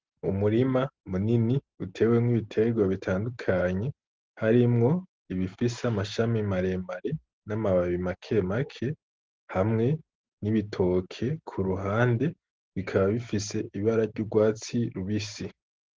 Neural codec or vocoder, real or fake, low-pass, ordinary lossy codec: none; real; 7.2 kHz; Opus, 16 kbps